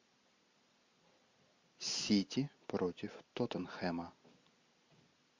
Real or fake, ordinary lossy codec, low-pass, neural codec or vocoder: real; MP3, 48 kbps; 7.2 kHz; none